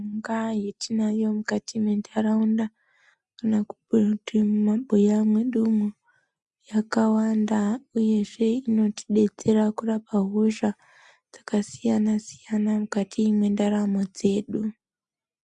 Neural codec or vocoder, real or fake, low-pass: none; real; 10.8 kHz